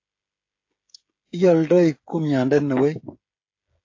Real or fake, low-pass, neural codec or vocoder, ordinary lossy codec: fake; 7.2 kHz; codec, 16 kHz, 16 kbps, FreqCodec, smaller model; AAC, 48 kbps